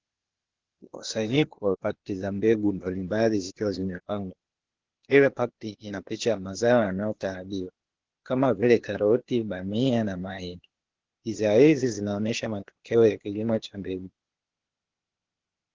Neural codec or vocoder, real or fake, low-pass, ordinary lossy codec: codec, 16 kHz, 0.8 kbps, ZipCodec; fake; 7.2 kHz; Opus, 16 kbps